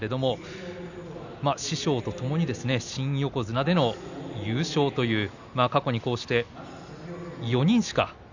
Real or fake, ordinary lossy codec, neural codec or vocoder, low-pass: real; none; none; 7.2 kHz